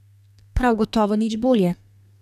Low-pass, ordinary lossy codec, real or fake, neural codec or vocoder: 14.4 kHz; none; fake; codec, 32 kHz, 1.9 kbps, SNAC